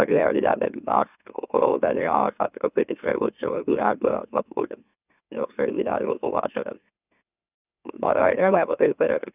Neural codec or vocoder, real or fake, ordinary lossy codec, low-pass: autoencoder, 44.1 kHz, a latent of 192 numbers a frame, MeloTTS; fake; none; 3.6 kHz